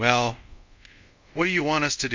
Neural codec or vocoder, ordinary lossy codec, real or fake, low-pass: codec, 24 kHz, 0.5 kbps, DualCodec; MP3, 64 kbps; fake; 7.2 kHz